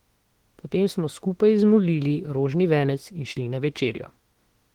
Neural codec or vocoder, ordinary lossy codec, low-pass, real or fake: autoencoder, 48 kHz, 32 numbers a frame, DAC-VAE, trained on Japanese speech; Opus, 16 kbps; 19.8 kHz; fake